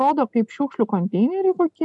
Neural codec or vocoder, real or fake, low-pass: none; real; 10.8 kHz